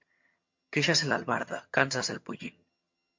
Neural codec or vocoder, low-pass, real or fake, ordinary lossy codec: vocoder, 22.05 kHz, 80 mel bands, HiFi-GAN; 7.2 kHz; fake; MP3, 48 kbps